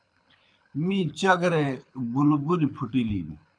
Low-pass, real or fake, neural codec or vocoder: 9.9 kHz; fake; codec, 24 kHz, 6 kbps, HILCodec